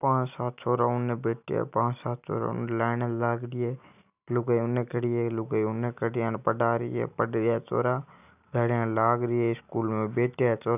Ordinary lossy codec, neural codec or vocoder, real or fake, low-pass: none; none; real; 3.6 kHz